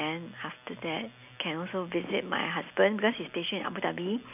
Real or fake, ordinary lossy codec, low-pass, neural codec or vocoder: real; none; 3.6 kHz; none